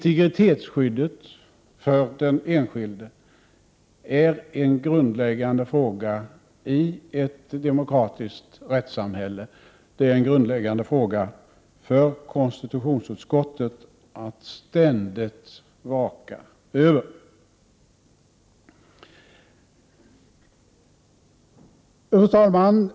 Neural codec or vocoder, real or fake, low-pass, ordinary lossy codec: none; real; none; none